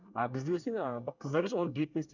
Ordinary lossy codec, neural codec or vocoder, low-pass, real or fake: none; codec, 24 kHz, 1 kbps, SNAC; 7.2 kHz; fake